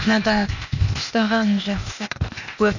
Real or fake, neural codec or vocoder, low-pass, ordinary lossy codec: fake; codec, 16 kHz, 0.8 kbps, ZipCodec; 7.2 kHz; none